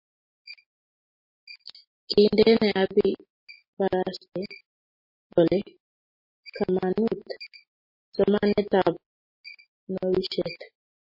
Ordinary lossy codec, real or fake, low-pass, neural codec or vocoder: MP3, 24 kbps; real; 5.4 kHz; none